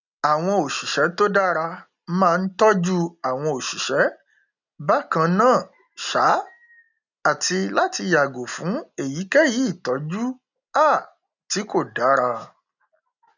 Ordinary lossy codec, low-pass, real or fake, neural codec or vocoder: none; 7.2 kHz; real; none